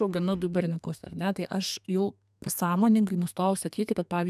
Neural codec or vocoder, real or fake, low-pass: codec, 32 kHz, 1.9 kbps, SNAC; fake; 14.4 kHz